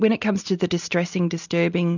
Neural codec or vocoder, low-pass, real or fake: none; 7.2 kHz; real